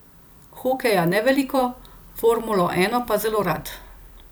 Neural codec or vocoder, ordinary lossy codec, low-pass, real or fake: vocoder, 44.1 kHz, 128 mel bands every 256 samples, BigVGAN v2; none; none; fake